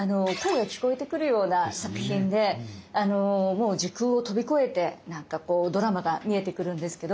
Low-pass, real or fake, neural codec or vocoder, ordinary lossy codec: none; real; none; none